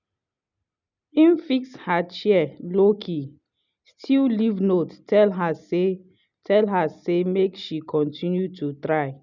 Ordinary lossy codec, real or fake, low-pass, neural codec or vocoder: none; real; 7.2 kHz; none